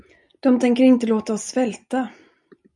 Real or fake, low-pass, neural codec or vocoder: real; 10.8 kHz; none